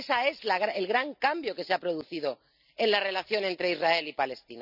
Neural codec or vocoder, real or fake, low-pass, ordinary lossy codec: none; real; 5.4 kHz; none